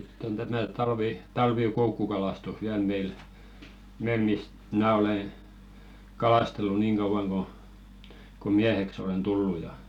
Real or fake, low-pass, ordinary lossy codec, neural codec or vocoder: real; 19.8 kHz; none; none